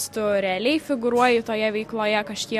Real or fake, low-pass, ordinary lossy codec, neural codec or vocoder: real; 14.4 kHz; MP3, 64 kbps; none